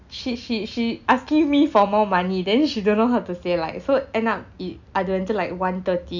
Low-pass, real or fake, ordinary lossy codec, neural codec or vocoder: 7.2 kHz; real; none; none